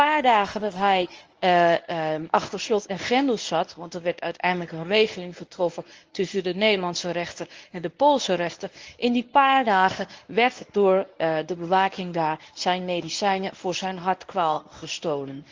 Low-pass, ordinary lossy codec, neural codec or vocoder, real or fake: 7.2 kHz; Opus, 24 kbps; codec, 24 kHz, 0.9 kbps, WavTokenizer, medium speech release version 1; fake